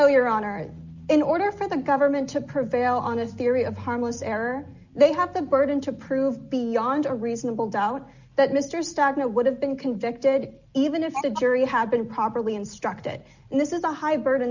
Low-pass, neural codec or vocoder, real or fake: 7.2 kHz; none; real